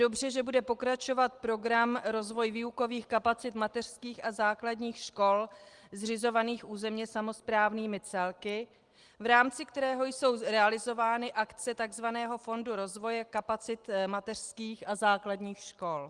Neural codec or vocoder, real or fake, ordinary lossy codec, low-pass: none; real; Opus, 24 kbps; 10.8 kHz